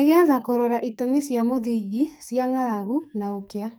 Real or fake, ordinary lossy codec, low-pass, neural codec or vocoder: fake; none; none; codec, 44.1 kHz, 2.6 kbps, SNAC